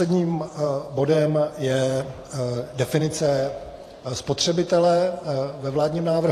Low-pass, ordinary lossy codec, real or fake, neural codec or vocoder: 14.4 kHz; AAC, 48 kbps; fake; vocoder, 48 kHz, 128 mel bands, Vocos